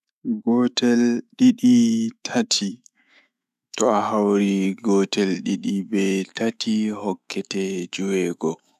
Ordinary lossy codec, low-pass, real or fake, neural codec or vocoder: none; 14.4 kHz; fake; autoencoder, 48 kHz, 128 numbers a frame, DAC-VAE, trained on Japanese speech